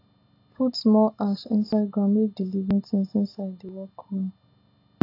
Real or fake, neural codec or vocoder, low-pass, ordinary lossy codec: real; none; 5.4 kHz; AAC, 32 kbps